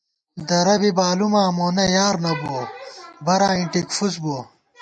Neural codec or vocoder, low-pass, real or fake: none; 9.9 kHz; real